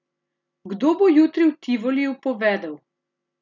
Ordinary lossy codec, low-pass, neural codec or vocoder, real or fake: none; 7.2 kHz; none; real